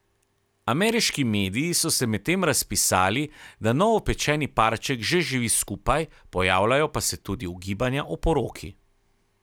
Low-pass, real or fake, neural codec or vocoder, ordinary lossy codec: none; real; none; none